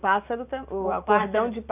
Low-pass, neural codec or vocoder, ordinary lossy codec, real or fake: 3.6 kHz; none; none; real